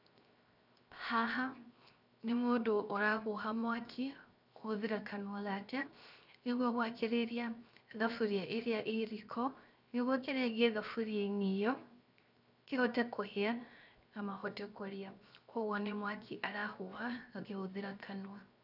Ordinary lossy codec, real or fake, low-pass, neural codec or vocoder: none; fake; 5.4 kHz; codec, 16 kHz, 0.7 kbps, FocalCodec